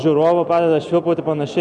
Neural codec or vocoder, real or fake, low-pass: none; real; 9.9 kHz